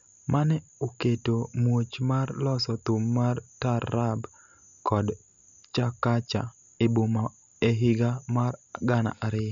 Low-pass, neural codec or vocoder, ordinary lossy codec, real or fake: 7.2 kHz; none; MP3, 64 kbps; real